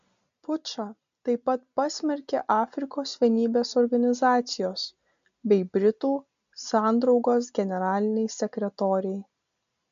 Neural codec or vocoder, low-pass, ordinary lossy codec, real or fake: none; 7.2 kHz; MP3, 64 kbps; real